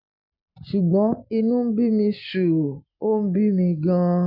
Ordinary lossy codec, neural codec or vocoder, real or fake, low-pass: none; none; real; 5.4 kHz